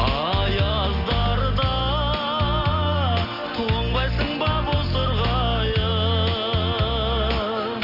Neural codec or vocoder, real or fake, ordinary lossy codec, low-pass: none; real; none; 5.4 kHz